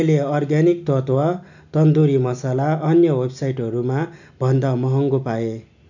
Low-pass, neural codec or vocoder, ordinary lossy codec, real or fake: 7.2 kHz; none; none; real